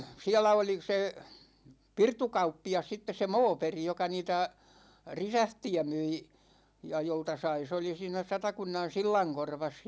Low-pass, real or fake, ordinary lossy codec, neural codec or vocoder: none; real; none; none